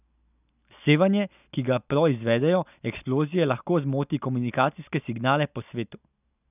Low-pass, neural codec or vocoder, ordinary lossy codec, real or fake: 3.6 kHz; none; none; real